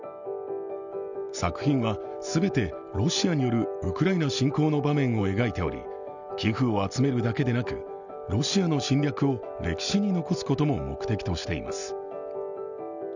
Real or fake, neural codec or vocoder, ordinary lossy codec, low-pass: real; none; none; 7.2 kHz